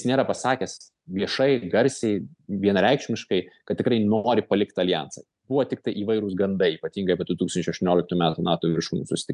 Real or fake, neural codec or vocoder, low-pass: real; none; 10.8 kHz